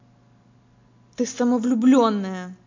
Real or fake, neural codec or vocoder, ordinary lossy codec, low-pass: real; none; MP3, 48 kbps; 7.2 kHz